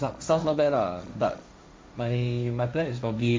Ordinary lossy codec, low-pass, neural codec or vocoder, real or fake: none; none; codec, 16 kHz, 1.1 kbps, Voila-Tokenizer; fake